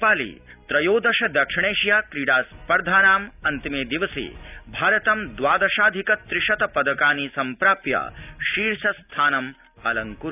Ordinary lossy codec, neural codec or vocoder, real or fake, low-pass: none; none; real; 3.6 kHz